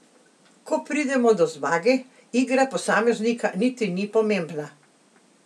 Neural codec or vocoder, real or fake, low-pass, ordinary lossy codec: none; real; none; none